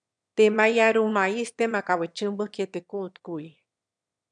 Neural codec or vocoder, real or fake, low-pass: autoencoder, 22.05 kHz, a latent of 192 numbers a frame, VITS, trained on one speaker; fake; 9.9 kHz